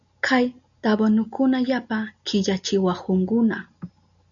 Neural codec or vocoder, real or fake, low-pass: none; real; 7.2 kHz